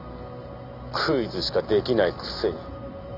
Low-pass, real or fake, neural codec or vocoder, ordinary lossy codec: 5.4 kHz; real; none; none